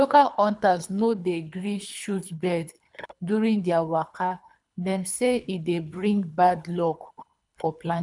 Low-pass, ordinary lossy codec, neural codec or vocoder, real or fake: 10.8 kHz; none; codec, 24 kHz, 3 kbps, HILCodec; fake